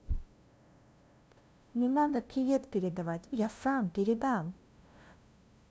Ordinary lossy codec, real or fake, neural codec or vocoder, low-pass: none; fake; codec, 16 kHz, 0.5 kbps, FunCodec, trained on LibriTTS, 25 frames a second; none